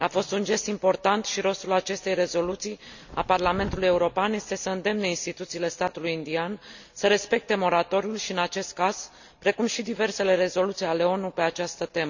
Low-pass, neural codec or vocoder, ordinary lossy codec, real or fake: 7.2 kHz; none; none; real